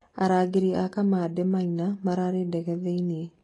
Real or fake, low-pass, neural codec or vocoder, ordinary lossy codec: real; 10.8 kHz; none; AAC, 32 kbps